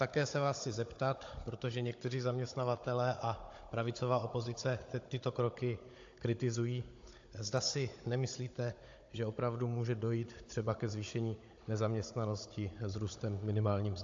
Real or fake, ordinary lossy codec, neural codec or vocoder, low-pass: fake; AAC, 48 kbps; codec, 16 kHz, 16 kbps, FunCodec, trained on Chinese and English, 50 frames a second; 7.2 kHz